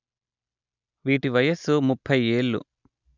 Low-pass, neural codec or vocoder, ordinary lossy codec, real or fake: 7.2 kHz; none; none; real